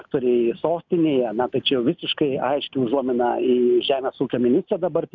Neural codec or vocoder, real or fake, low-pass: none; real; 7.2 kHz